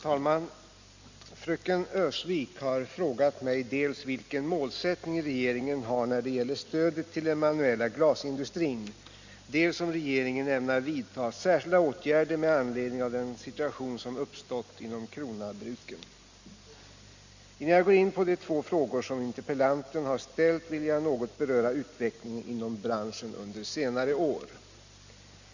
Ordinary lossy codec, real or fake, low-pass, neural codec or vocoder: Opus, 64 kbps; real; 7.2 kHz; none